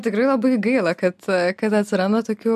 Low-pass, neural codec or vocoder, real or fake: 14.4 kHz; none; real